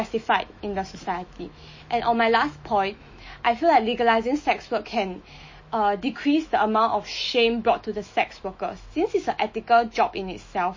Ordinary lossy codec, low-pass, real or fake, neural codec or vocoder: MP3, 32 kbps; 7.2 kHz; fake; autoencoder, 48 kHz, 128 numbers a frame, DAC-VAE, trained on Japanese speech